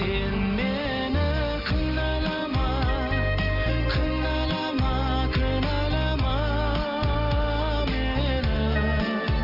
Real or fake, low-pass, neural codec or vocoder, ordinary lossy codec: real; 5.4 kHz; none; none